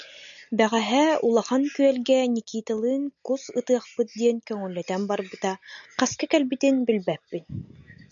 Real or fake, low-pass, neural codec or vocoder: real; 7.2 kHz; none